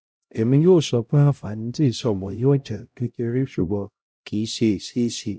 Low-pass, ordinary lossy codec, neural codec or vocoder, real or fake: none; none; codec, 16 kHz, 0.5 kbps, X-Codec, HuBERT features, trained on LibriSpeech; fake